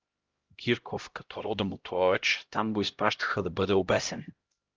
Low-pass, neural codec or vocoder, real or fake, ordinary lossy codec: 7.2 kHz; codec, 16 kHz, 0.5 kbps, X-Codec, HuBERT features, trained on LibriSpeech; fake; Opus, 32 kbps